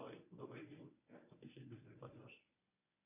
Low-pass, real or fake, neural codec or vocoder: 3.6 kHz; fake; codec, 24 kHz, 0.9 kbps, WavTokenizer, medium speech release version 1